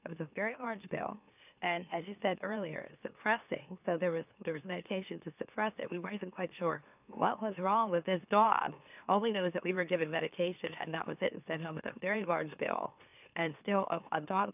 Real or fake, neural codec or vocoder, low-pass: fake; autoencoder, 44.1 kHz, a latent of 192 numbers a frame, MeloTTS; 3.6 kHz